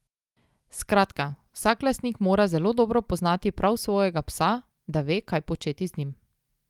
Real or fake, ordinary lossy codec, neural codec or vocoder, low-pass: real; Opus, 32 kbps; none; 19.8 kHz